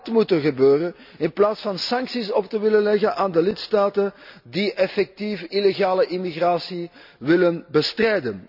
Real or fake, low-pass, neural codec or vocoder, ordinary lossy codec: real; 5.4 kHz; none; none